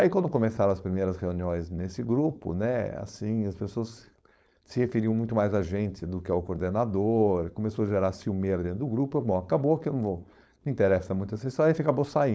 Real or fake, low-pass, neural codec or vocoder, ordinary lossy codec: fake; none; codec, 16 kHz, 4.8 kbps, FACodec; none